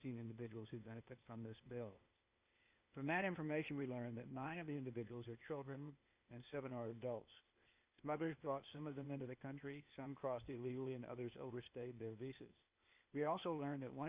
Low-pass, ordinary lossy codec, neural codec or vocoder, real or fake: 3.6 kHz; Opus, 64 kbps; codec, 16 kHz, 0.8 kbps, ZipCodec; fake